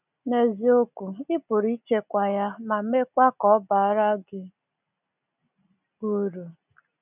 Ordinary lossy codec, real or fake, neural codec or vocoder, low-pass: none; real; none; 3.6 kHz